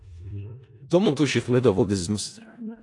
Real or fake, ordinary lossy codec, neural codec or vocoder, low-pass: fake; AAC, 48 kbps; codec, 16 kHz in and 24 kHz out, 0.4 kbps, LongCat-Audio-Codec, four codebook decoder; 10.8 kHz